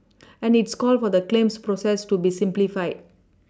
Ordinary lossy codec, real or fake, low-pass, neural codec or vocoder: none; real; none; none